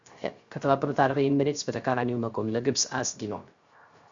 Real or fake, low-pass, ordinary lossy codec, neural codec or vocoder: fake; 7.2 kHz; Opus, 64 kbps; codec, 16 kHz, 0.3 kbps, FocalCodec